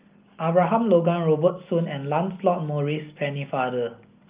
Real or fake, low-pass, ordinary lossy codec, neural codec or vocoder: real; 3.6 kHz; Opus, 24 kbps; none